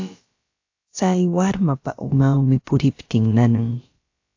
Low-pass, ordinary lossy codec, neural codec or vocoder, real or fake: 7.2 kHz; AAC, 48 kbps; codec, 16 kHz, about 1 kbps, DyCAST, with the encoder's durations; fake